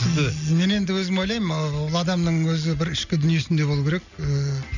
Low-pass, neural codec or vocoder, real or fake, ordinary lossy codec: 7.2 kHz; none; real; none